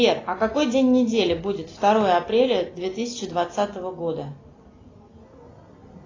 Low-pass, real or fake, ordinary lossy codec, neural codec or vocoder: 7.2 kHz; real; AAC, 32 kbps; none